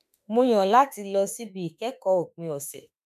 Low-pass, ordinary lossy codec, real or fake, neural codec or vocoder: 14.4 kHz; none; fake; autoencoder, 48 kHz, 32 numbers a frame, DAC-VAE, trained on Japanese speech